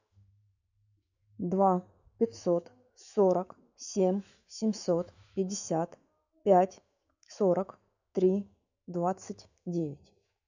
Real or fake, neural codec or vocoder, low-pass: fake; autoencoder, 48 kHz, 128 numbers a frame, DAC-VAE, trained on Japanese speech; 7.2 kHz